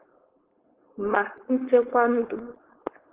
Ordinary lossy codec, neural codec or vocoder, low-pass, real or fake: Opus, 16 kbps; codec, 16 kHz, 4.8 kbps, FACodec; 3.6 kHz; fake